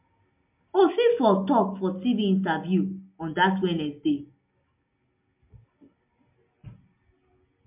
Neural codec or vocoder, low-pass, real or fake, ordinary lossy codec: none; 3.6 kHz; real; MP3, 32 kbps